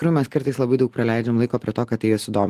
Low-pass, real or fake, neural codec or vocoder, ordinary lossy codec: 14.4 kHz; real; none; Opus, 24 kbps